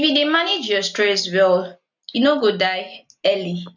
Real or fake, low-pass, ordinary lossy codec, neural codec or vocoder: real; 7.2 kHz; none; none